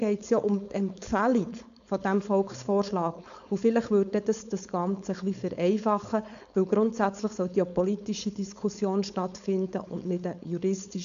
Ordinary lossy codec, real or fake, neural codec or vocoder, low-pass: none; fake; codec, 16 kHz, 4.8 kbps, FACodec; 7.2 kHz